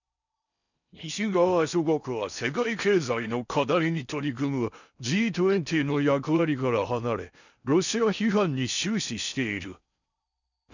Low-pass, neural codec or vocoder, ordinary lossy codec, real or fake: 7.2 kHz; codec, 16 kHz in and 24 kHz out, 0.6 kbps, FocalCodec, streaming, 4096 codes; none; fake